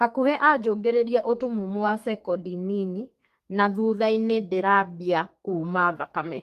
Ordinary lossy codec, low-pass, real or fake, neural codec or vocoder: Opus, 24 kbps; 14.4 kHz; fake; codec, 32 kHz, 1.9 kbps, SNAC